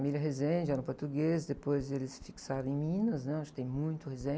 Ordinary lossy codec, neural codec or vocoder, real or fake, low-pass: none; none; real; none